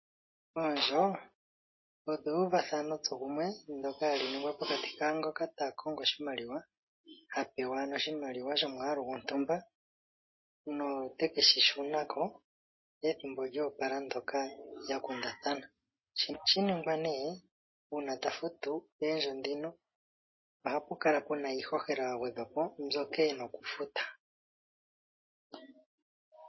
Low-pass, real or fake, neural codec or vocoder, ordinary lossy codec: 7.2 kHz; real; none; MP3, 24 kbps